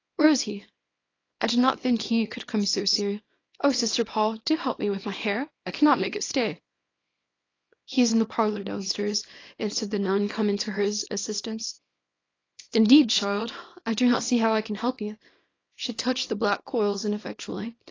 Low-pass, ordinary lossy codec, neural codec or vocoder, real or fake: 7.2 kHz; AAC, 32 kbps; codec, 24 kHz, 0.9 kbps, WavTokenizer, small release; fake